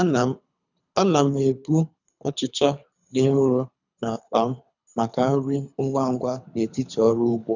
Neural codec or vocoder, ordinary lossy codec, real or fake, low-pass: codec, 24 kHz, 3 kbps, HILCodec; none; fake; 7.2 kHz